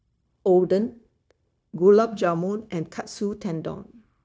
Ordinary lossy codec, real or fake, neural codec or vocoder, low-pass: none; fake; codec, 16 kHz, 0.9 kbps, LongCat-Audio-Codec; none